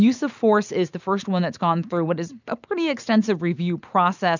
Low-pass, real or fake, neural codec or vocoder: 7.2 kHz; real; none